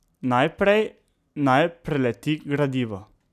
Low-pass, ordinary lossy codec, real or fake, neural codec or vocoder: 14.4 kHz; none; real; none